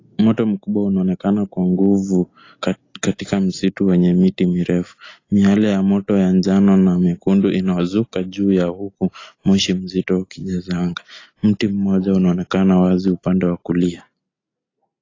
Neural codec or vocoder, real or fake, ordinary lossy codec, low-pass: none; real; AAC, 32 kbps; 7.2 kHz